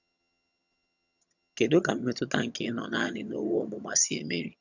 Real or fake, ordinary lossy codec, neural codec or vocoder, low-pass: fake; none; vocoder, 22.05 kHz, 80 mel bands, HiFi-GAN; 7.2 kHz